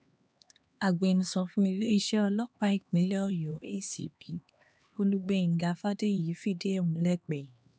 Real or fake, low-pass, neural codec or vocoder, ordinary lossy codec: fake; none; codec, 16 kHz, 2 kbps, X-Codec, HuBERT features, trained on LibriSpeech; none